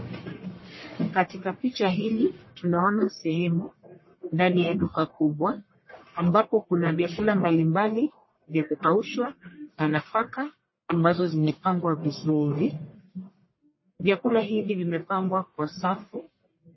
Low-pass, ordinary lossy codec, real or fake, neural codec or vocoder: 7.2 kHz; MP3, 24 kbps; fake; codec, 44.1 kHz, 1.7 kbps, Pupu-Codec